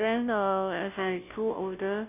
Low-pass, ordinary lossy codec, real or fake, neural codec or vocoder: 3.6 kHz; none; fake; codec, 16 kHz, 0.5 kbps, FunCodec, trained on Chinese and English, 25 frames a second